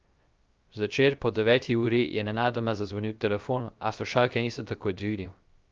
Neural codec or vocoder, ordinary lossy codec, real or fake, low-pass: codec, 16 kHz, 0.3 kbps, FocalCodec; Opus, 24 kbps; fake; 7.2 kHz